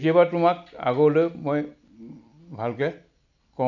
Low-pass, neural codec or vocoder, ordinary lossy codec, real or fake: 7.2 kHz; none; none; real